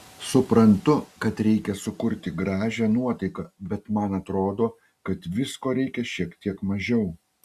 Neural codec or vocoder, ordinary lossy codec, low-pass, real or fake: none; Opus, 64 kbps; 14.4 kHz; real